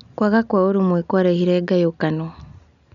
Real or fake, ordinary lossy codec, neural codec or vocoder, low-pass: real; none; none; 7.2 kHz